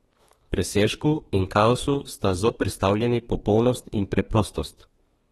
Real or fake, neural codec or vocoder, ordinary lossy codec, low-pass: fake; codec, 32 kHz, 1.9 kbps, SNAC; AAC, 32 kbps; 14.4 kHz